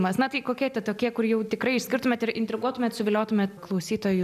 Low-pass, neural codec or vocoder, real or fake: 14.4 kHz; none; real